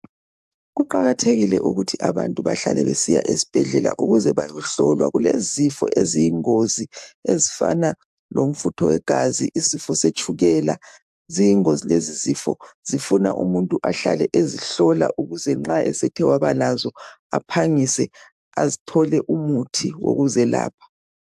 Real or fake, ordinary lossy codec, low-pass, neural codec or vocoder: fake; AAC, 96 kbps; 14.4 kHz; codec, 44.1 kHz, 7.8 kbps, DAC